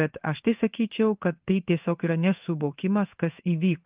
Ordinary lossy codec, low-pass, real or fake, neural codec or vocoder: Opus, 24 kbps; 3.6 kHz; fake; codec, 16 kHz in and 24 kHz out, 1 kbps, XY-Tokenizer